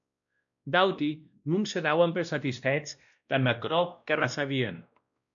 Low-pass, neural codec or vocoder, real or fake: 7.2 kHz; codec, 16 kHz, 1 kbps, X-Codec, WavLM features, trained on Multilingual LibriSpeech; fake